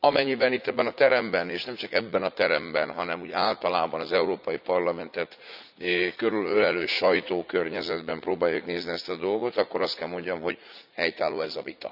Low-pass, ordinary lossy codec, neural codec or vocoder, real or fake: 5.4 kHz; none; vocoder, 44.1 kHz, 80 mel bands, Vocos; fake